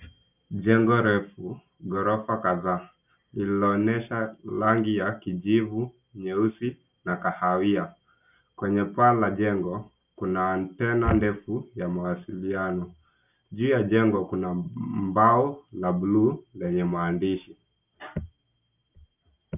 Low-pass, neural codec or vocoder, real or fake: 3.6 kHz; none; real